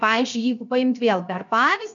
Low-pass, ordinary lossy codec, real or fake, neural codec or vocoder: 7.2 kHz; MP3, 64 kbps; fake; codec, 16 kHz, 0.7 kbps, FocalCodec